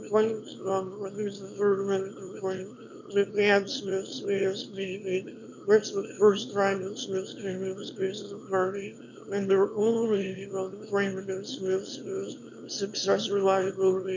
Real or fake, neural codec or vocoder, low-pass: fake; autoencoder, 22.05 kHz, a latent of 192 numbers a frame, VITS, trained on one speaker; 7.2 kHz